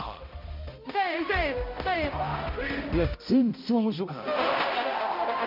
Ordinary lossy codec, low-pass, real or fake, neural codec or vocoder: MP3, 48 kbps; 5.4 kHz; fake; codec, 16 kHz, 0.5 kbps, X-Codec, HuBERT features, trained on balanced general audio